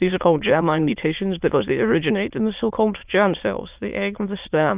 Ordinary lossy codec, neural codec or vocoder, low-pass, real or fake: Opus, 24 kbps; autoencoder, 22.05 kHz, a latent of 192 numbers a frame, VITS, trained on many speakers; 3.6 kHz; fake